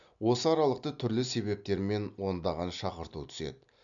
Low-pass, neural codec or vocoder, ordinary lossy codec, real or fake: 7.2 kHz; none; none; real